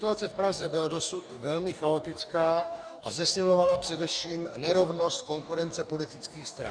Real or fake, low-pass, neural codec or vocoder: fake; 9.9 kHz; codec, 44.1 kHz, 2.6 kbps, DAC